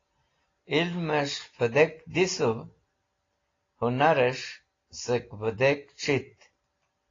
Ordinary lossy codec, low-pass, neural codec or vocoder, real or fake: AAC, 32 kbps; 7.2 kHz; none; real